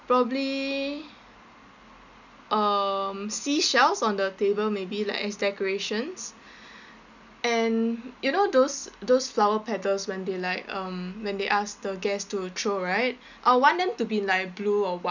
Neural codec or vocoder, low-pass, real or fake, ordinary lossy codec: none; 7.2 kHz; real; none